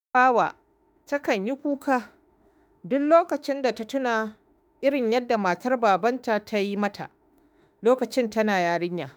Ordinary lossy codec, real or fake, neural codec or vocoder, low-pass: none; fake; autoencoder, 48 kHz, 32 numbers a frame, DAC-VAE, trained on Japanese speech; none